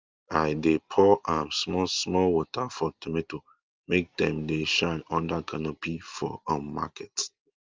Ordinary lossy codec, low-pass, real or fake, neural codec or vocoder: Opus, 32 kbps; 7.2 kHz; real; none